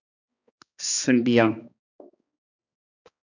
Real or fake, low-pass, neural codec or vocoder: fake; 7.2 kHz; codec, 16 kHz, 2 kbps, X-Codec, HuBERT features, trained on balanced general audio